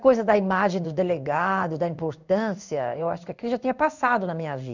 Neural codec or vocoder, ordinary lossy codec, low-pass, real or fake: codec, 16 kHz in and 24 kHz out, 1 kbps, XY-Tokenizer; none; 7.2 kHz; fake